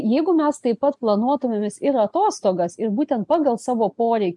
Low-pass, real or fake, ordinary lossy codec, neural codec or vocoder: 10.8 kHz; real; MP3, 64 kbps; none